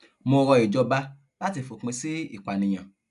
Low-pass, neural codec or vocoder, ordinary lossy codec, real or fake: 10.8 kHz; none; none; real